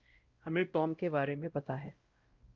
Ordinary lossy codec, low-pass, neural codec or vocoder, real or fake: Opus, 32 kbps; 7.2 kHz; codec, 16 kHz, 0.5 kbps, X-Codec, WavLM features, trained on Multilingual LibriSpeech; fake